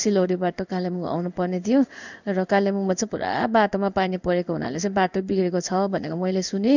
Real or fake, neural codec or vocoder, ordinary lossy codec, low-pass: fake; codec, 16 kHz in and 24 kHz out, 1 kbps, XY-Tokenizer; none; 7.2 kHz